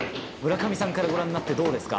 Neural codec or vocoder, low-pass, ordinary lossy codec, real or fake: none; none; none; real